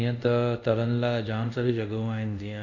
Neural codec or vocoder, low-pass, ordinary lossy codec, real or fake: codec, 24 kHz, 0.5 kbps, DualCodec; 7.2 kHz; none; fake